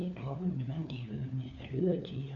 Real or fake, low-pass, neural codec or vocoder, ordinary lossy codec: fake; 7.2 kHz; codec, 16 kHz, 4 kbps, FunCodec, trained on LibriTTS, 50 frames a second; none